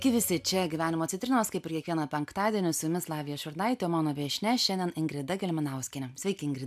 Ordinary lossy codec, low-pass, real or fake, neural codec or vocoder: AAC, 96 kbps; 14.4 kHz; real; none